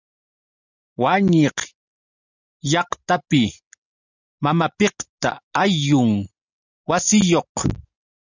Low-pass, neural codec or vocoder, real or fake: 7.2 kHz; none; real